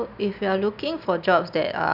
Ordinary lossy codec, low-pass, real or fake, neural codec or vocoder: none; 5.4 kHz; real; none